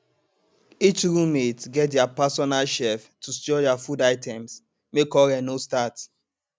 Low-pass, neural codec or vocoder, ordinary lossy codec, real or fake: none; none; none; real